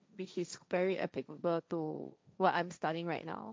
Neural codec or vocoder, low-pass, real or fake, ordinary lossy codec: codec, 16 kHz, 1.1 kbps, Voila-Tokenizer; none; fake; none